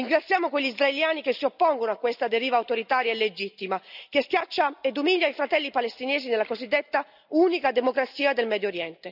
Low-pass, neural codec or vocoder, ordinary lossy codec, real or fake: 5.4 kHz; none; none; real